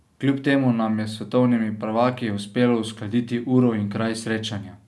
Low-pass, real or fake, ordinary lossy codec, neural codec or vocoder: none; real; none; none